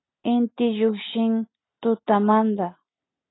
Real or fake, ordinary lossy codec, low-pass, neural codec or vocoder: real; AAC, 16 kbps; 7.2 kHz; none